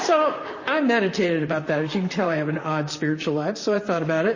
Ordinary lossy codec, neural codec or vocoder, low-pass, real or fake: MP3, 32 kbps; vocoder, 44.1 kHz, 128 mel bands, Pupu-Vocoder; 7.2 kHz; fake